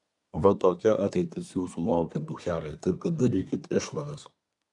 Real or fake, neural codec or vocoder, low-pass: fake; codec, 24 kHz, 1 kbps, SNAC; 10.8 kHz